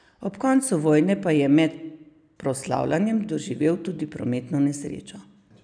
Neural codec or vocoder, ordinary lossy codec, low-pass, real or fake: none; none; 9.9 kHz; real